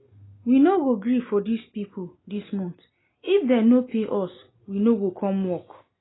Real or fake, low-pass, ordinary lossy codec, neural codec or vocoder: real; 7.2 kHz; AAC, 16 kbps; none